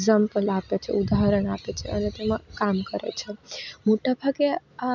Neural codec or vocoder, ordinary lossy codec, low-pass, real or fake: none; none; 7.2 kHz; real